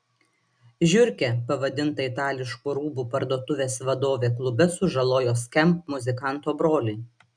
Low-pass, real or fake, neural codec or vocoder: 9.9 kHz; real; none